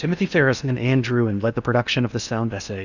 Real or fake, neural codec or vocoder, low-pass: fake; codec, 16 kHz in and 24 kHz out, 0.8 kbps, FocalCodec, streaming, 65536 codes; 7.2 kHz